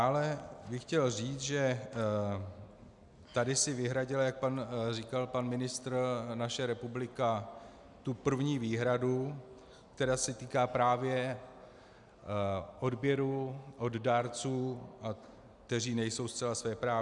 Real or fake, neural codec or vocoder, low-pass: real; none; 10.8 kHz